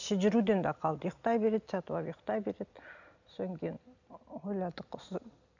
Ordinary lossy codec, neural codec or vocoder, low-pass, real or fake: none; none; 7.2 kHz; real